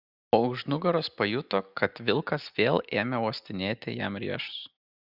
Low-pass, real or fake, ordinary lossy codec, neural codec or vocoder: 5.4 kHz; real; Opus, 64 kbps; none